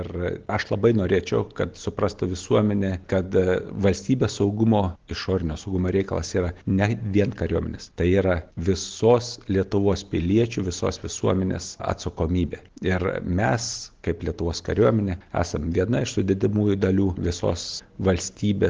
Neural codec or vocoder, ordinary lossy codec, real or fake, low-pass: none; Opus, 16 kbps; real; 7.2 kHz